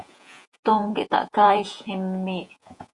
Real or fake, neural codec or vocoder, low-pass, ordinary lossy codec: fake; vocoder, 48 kHz, 128 mel bands, Vocos; 10.8 kHz; MP3, 64 kbps